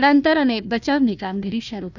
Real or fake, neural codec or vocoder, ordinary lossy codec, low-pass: fake; codec, 16 kHz, 1 kbps, FunCodec, trained on Chinese and English, 50 frames a second; none; 7.2 kHz